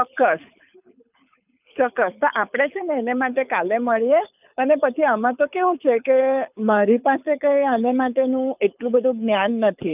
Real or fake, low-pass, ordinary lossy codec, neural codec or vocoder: fake; 3.6 kHz; none; codec, 24 kHz, 6 kbps, HILCodec